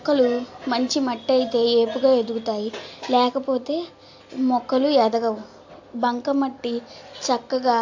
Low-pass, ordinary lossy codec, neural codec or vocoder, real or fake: 7.2 kHz; AAC, 48 kbps; none; real